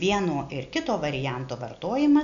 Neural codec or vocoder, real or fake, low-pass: none; real; 7.2 kHz